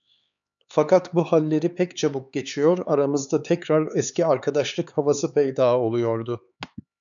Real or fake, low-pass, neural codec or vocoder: fake; 7.2 kHz; codec, 16 kHz, 4 kbps, X-Codec, HuBERT features, trained on LibriSpeech